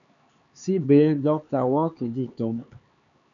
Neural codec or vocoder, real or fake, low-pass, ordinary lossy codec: codec, 16 kHz, 4 kbps, X-Codec, HuBERT features, trained on LibriSpeech; fake; 7.2 kHz; MP3, 96 kbps